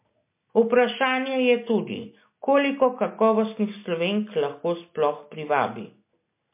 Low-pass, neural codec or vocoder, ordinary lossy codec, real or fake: 3.6 kHz; none; MP3, 24 kbps; real